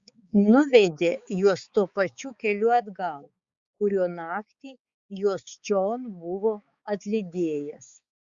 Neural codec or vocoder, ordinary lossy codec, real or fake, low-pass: codec, 16 kHz, 4 kbps, X-Codec, HuBERT features, trained on balanced general audio; Opus, 64 kbps; fake; 7.2 kHz